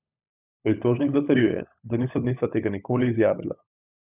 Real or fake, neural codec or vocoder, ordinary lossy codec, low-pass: fake; codec, 16 kHz, 16 kbps, FunCodec, trained on LibriTTS, 50 frames a second; none; 3.6 kHz